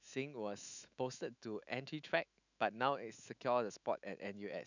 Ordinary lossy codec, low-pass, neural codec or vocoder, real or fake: none; 7.2 kHz; autoencoder, 48 kHz, 128 numbers a frame, DAC-VAE, trained on Japanese speech; fake